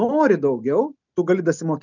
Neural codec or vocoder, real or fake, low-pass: none; real; 7.2 kHz